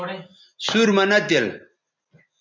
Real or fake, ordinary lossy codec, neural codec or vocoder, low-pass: real; MP3, 64 kbps; none; 7.2 kHz